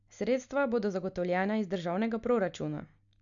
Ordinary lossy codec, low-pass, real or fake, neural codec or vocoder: none; 7.2 kHz; real; none